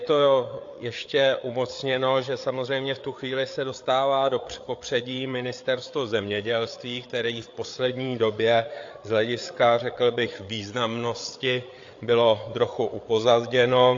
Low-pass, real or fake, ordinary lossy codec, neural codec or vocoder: 7.2 kHz; fake; AAC, 48 kbps; codec, 16 kHz, 8 kbps, FreqCodec, larger model